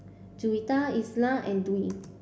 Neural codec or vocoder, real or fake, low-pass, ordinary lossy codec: none; real; none; none